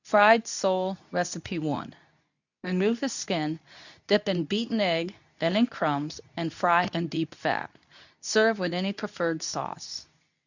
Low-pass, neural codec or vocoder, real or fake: 7.2 kHz; codec, 24 kHz, 0.9 kbps, WavTokenizer, medium speech release version 2; fake